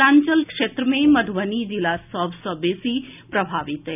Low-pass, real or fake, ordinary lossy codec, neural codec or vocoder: 3.6 kHz; real; none; none